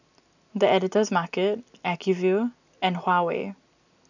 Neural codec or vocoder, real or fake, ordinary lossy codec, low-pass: none; real; none; 7.2 kHz